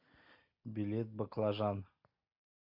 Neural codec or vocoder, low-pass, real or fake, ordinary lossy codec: none; 5.4 kHz; real; MP3, 48 kbps